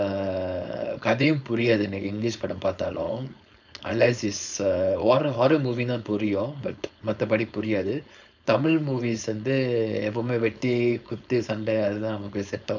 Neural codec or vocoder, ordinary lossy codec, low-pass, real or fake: codec, 16 kHz, 4.8 kbps, FACodec; none; 7.2 kHz; fake